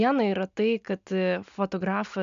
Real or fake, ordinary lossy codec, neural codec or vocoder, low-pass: real; MP3, 64 kbps; none; 7.2 kHz